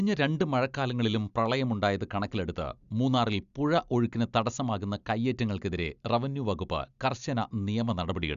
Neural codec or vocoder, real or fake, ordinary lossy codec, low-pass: none; real; Opus, 64 kbps; 7.2 kHz